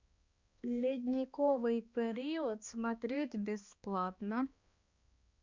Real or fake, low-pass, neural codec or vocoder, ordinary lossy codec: fake; 7.2 kHz; codec, 16 kHz, 2 kbps, X-Codec, HuBERT features, trained on balanced general audio; Opus, 64 kbps